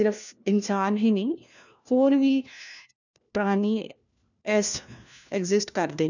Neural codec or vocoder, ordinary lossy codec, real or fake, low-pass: codec, 16 kHz, 1 kbps, FunCodec, trained on LibriTTS, 50 frames a second; none; fake; 7.2 kHz